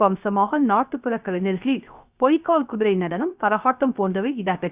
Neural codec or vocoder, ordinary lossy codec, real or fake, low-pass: codec, 16 kHz, 0.3 kbps, FocalCodec; Opus, 64 kbps; fake; 3.6 kHz